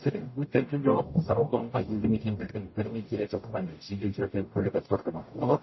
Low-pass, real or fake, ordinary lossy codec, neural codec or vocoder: 7.2 kHz; fake; MP3, 24 kbps; codec, 44.1 kHz, 0.9 kbps, DAC